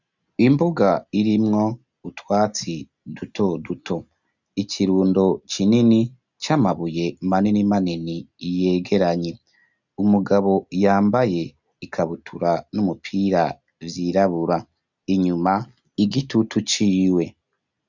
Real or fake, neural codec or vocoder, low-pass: real; none; 7.2 kHz